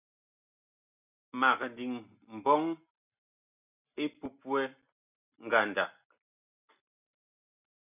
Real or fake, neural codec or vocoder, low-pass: real; none; 3.6 kHz